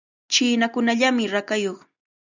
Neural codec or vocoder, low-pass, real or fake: none; 7.2 kHz; real